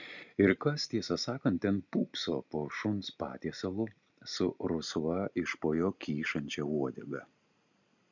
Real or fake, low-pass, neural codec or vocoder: real; 7.2 kHz; none